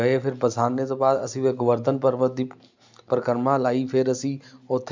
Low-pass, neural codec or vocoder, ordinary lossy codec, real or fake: 7.2 kHz; none; MP3, 64 kbps; real